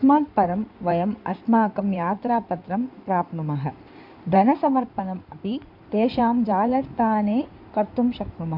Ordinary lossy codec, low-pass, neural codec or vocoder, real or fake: MP3, 48 kbps; 5.4 kHz; codec, 16 kHz in and 24 kHz out, 2.2 kbps, FireRedTTS-2 codec; fake